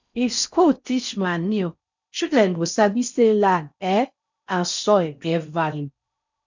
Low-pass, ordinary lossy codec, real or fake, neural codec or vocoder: 7.2 kHz; none; fake; codec, 16 kHz in and 24 kHz out, 0.6 kbps, FocalCodec, streaming, 4096 codes